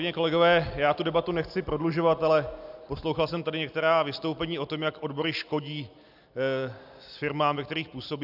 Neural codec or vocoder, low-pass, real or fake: none; 5.4 kHz; real